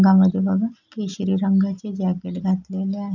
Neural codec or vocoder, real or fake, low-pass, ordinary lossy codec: none; real; 7.2 kHz; none